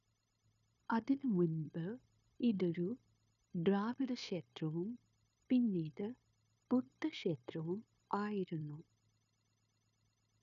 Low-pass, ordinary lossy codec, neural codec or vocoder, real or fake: 7.2 kHz; none; codec, 16 kHz, 0.9 kbps, LongCat-Audio-Codec; fake